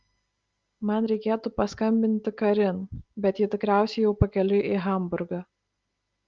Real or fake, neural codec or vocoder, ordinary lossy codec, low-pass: real; none; Opus, 32 kbps; 7.2 kHz